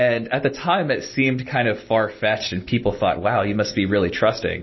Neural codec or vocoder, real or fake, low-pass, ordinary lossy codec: none; real; 7.2 kHz; MP3, 24 kbps